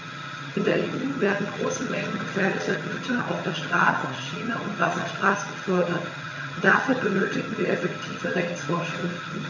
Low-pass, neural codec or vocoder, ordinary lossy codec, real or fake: 7.2 kHz; vocoder, 22.05 kHz, 80 mel bands, HiFi-GAN; none; fake